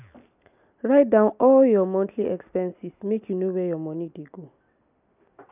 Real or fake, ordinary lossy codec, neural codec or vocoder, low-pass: real; none; none; 3.6 kHz